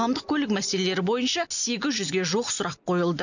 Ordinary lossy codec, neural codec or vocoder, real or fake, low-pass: none; none; real; 7.2 kHz